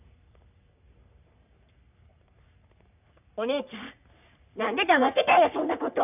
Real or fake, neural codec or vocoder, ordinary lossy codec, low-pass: fake; codec, 44.1 kHz, 3.4 kbps, Pupu-Codec; none; 3.6 kHz